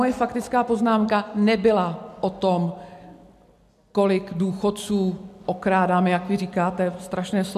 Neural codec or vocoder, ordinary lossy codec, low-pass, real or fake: none; MP3, 96 kbps; 14.4 kHz; real